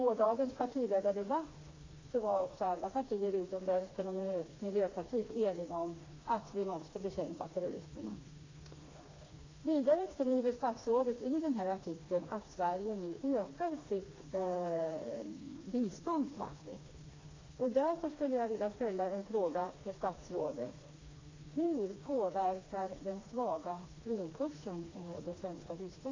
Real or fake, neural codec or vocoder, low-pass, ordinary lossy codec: fake; codec, 16 kHz, 2 kbps, FreqCodec, smaller model; 7.2 kHz; AAC, 32 kbps